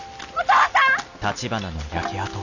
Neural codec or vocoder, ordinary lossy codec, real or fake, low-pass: none; none; real; 7.2 kHz